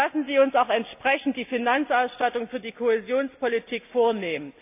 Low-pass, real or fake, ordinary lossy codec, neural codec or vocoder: 3.6 kHz; real; AAC, 32 kbps; none